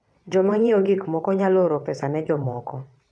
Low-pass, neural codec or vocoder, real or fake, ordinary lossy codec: none; vocoder, 22.05 kHz, 80 mel bands, WaveNeXt; fake; none